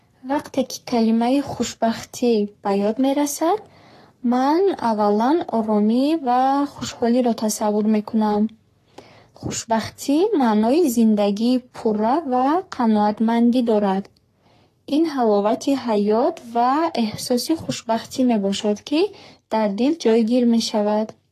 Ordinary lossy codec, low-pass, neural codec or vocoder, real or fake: AAC, 48 kbps; 14.4 kHz; codec, 44.1 kHz, 3.4 kbps, Pupu-Codec; fake